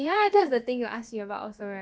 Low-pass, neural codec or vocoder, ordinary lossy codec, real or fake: none; codec, 16 kHz, about 1 kbps, DyCAST, with the encoder's durations; none; fake